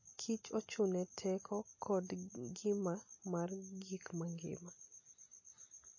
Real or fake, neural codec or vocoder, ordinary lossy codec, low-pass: real; none; MP3, 32 kbps; 7.2 kHz